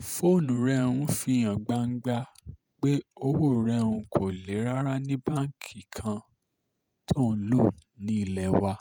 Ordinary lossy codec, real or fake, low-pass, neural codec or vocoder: none; fake; none; vocoder, 48 kHz, 128 mel bands, Vocos